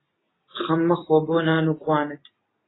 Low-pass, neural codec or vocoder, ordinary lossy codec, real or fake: 7.2 kHz; none; AAC, 16 kbps; real